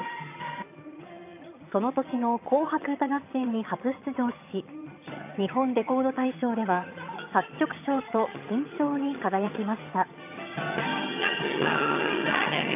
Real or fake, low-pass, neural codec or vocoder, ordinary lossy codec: fake; 3.6 kHz; vocoder, 22.05 kHz, 80 mel bands, HiFi-GAN; AAC, 32 kbps